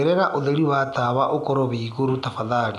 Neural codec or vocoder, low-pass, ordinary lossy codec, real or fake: none; none; none; real